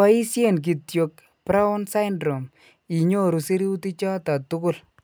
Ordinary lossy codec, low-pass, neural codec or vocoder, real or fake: none; none; none; real